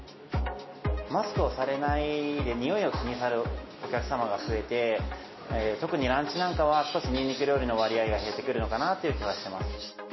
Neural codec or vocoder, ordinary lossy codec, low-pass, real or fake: none; MP3, 24 kbps; 7.2 kHz; real